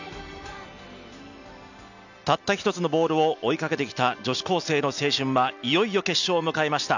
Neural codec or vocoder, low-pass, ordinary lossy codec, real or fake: none; 7.2 kHz; none; real